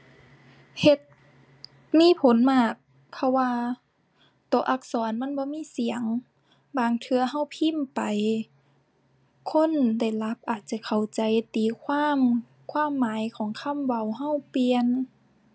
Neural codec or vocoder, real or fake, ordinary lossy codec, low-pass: none; real; none; none